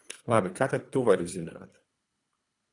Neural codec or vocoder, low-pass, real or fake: codec, 24 kHz, 3 kbps, HILCodec; 10.8 kHz; fake